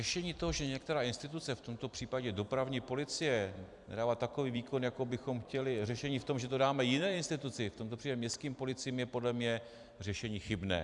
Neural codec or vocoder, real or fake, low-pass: vocoder, 44.1 kHz, 128 mel bands every 256 samples, BigVGAN v2; fake; 10.8 kHz